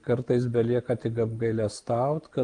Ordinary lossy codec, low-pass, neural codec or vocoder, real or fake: Opus, 32 kbps; 9.9 kHz; none; real